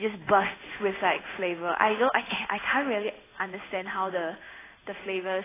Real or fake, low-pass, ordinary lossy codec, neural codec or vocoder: fake; 3.6 kHz; AAC, 16 kbps; codec, 16 kHz in and 24 kHz out, 1 kbps, XY-Tokenizer